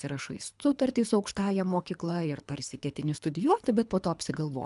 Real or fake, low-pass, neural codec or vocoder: fake; 10.8 kHz; codec, 24 kHz, 3 kbps, HILCodec